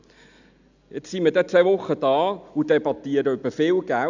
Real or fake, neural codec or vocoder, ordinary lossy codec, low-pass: real; none; none; 7.2 kHz